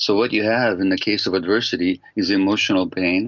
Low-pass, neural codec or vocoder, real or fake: 7.2 kHz; none; real